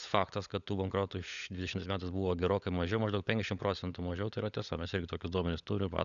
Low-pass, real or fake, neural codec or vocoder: 7.2 kHz; fake; codec, 16 kHz, 16 kbps, FunCodec, trained on LibriTTS, 50 frames a second